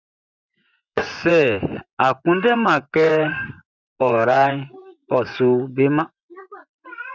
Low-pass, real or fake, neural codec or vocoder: 7.2 kHz; fake; vocoder, 24 kHz, 100 mel bands, Vocos